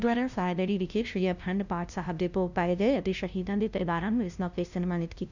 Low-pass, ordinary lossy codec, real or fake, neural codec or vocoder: 7.2 kHz; none; fake; codec, 16 kHz, 0.5 kbps, FunCodec, trained on LibriTTS, 25 frames a second